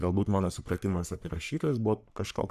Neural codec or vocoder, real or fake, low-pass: codec, 44.1 kHz, 3.4 kbps, Pupu-Codec; fake; 14.4 kHz